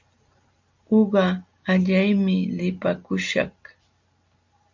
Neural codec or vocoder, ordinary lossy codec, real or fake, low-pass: none; MP3, 64 kbps; real; 7.2 kHz